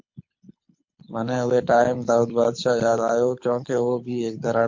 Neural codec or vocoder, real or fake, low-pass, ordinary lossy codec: codec, 24 kHz, 6 kbps, HILCodec; fake; 7.2 kHz; MP3, 48 kbps